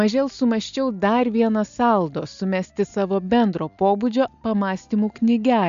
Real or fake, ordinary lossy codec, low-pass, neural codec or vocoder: real; MP3, 96 kbps; 7.2 kHz; none